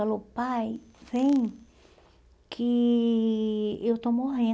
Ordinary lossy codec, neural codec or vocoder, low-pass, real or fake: none; none; none; real